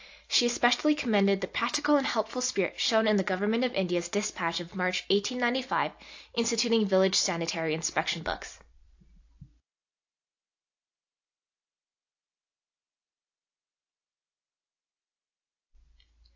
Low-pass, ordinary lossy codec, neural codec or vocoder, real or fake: 7.2 kHz; AAC, 48 kbps; none; real